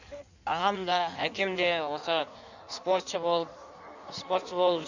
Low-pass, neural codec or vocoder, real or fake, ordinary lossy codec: 7.2 kHz; codec, 16 kHz in and 24 kHz out, 1.1 kbps, FireRedTTS-2 codec; fake; none